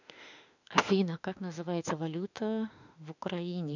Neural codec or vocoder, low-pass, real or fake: autoencoder, 48 kHz, 32 numbers a frame, DAC-VAE, trained on Japanese speech; 7.2 kHz; fake